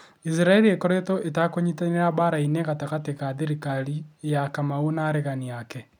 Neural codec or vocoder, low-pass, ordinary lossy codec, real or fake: none; 19.8 kHz; none; real